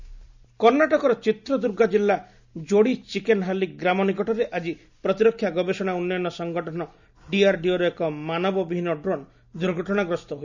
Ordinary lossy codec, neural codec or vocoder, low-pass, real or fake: none; none; 7.2 kHz; real